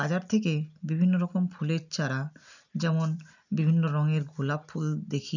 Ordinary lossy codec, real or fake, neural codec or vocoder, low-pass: none; real; none; 7.2 kHz